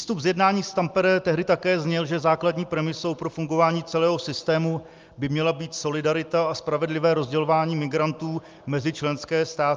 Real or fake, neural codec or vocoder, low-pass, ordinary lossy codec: real; none; 7.2 kHz; Opus, 24 kbps